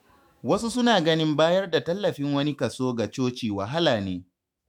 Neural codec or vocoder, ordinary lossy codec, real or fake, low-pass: autoencoder, 48 kHz, 128 numbers a frame, DAC-VAE, trained on Japanese speech; MP3, 96 kbps; fake; 19.8 kHz